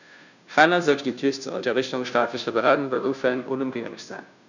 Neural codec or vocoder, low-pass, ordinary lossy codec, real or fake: codec, 16 kHz, 0.5 kbps, FunCodec, trained on Chinese and English, 25 frames a second; 7.2 kHz; none; fake